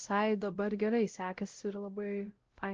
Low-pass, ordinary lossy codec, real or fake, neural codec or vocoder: 7.2 kHz; Opus, 16 kbps; fake; codec, 16 kHz, 0.5 kbps, X-Codec, WavLM features, trained on Multilingual LibriSpeech